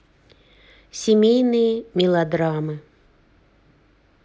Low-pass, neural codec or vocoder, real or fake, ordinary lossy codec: none; none; real; none